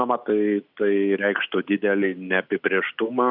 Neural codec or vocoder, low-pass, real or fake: none; 5.4 kHz; real